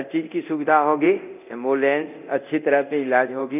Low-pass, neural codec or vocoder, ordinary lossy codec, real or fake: 3.6 kHz; codec, 24 kHz, 0.9 kbps, DualCodec; none; fake